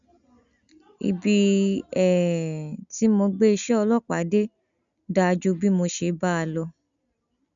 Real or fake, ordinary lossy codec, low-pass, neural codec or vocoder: real; none; 7.2 kHz; none